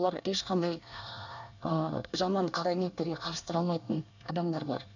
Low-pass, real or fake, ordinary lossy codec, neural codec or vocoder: 7.2 kHz; fake; none; codec, 24 kHz, 1 kbps, SNAC